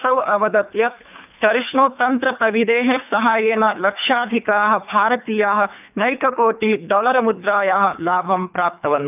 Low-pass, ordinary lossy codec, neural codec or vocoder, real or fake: 3.6 kHz; none; codec, 24 kHz, 3 kbps, HILCodec; fake